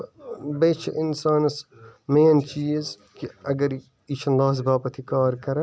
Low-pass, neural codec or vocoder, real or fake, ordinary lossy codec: none; none; real; none